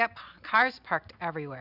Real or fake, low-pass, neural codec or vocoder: real; 5.4 kHz; none